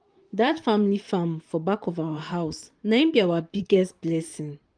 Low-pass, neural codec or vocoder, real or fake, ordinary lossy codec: 9.9 kHz; vocoder, 44.1 kHz, 128 mel bands, Pupu-Vocoder; fake; Opus, 32 kbps